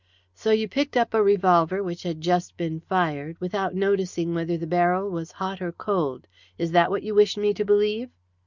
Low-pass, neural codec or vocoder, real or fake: 7.2 kHz; none; real